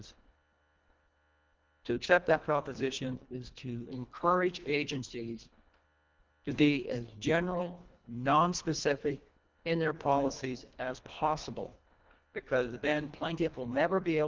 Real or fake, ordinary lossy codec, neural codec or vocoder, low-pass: fake; Opus, 32 kbps; codec, 24 kHz, 1.5 kbps, HILCodec; 7.2 kHz